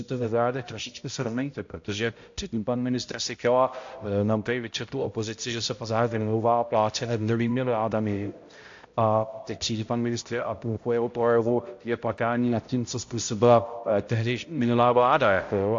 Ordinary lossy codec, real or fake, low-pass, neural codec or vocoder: AAC, 48 kbps; fake; 7.2 kHz; codec, 16 kHz, 0.5 kbps, X-Codec, HuBERT features, trained on balanced general audio